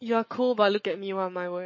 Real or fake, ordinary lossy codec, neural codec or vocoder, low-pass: fake; MP3, 32 kbps; codec, 44.1 kHz, 7.8 kbps, Pupu-Codec; 7.2 kHz